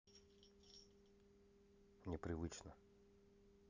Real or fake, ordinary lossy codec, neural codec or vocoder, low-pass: real; none; none; 7.2 kHz